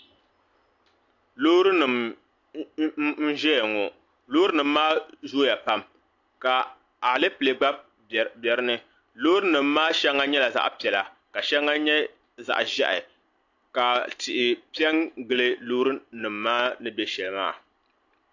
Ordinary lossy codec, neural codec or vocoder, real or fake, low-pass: AAC, 48 kbps; none; real; 7.2 kHz